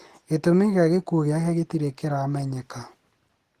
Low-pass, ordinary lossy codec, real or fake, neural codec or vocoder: 14.4 kHz; Opus, 16 kbps; real; none